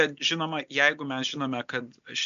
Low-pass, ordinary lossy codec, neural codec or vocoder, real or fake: 7.2 kHz; MP3, 64 kbps; none; real